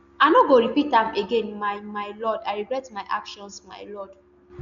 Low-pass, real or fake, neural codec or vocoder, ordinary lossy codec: 7.2 kHz; real; none; none